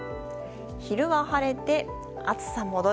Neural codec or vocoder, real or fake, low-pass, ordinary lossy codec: none; real; none; none